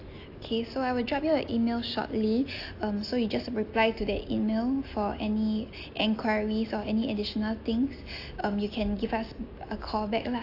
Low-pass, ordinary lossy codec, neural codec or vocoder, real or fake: 5.4 kHz; AAC, 32 kbps; none; real